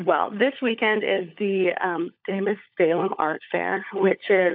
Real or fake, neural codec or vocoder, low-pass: fake; codec, 16 kHz, 16 kbps, FunCodec, trained on LibriTTS, 50 frames a second; 5.4 kHz